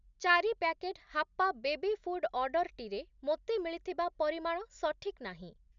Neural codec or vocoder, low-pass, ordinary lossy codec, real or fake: none; 7.2 kHz; none; real